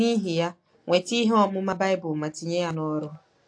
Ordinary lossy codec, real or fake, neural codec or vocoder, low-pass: AAC, 48 kbps; real; none; 9.9 kHz